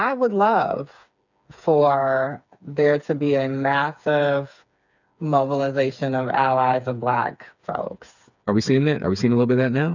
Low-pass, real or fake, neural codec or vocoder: 7.2 kHz; fake; codec, 16 kHz, 4 kbps, FreqCodec, smaller model